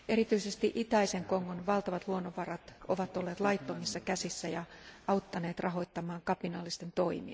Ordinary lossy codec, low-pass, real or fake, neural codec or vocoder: none; none; real; none